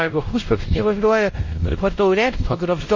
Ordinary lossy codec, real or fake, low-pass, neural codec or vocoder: MP3, 32 kbps; fake; 7.2 kHz; codec, 16 kHz, 0.5 kbps, X-Codec, WavLM features, trained on Multilingual LibriSpeech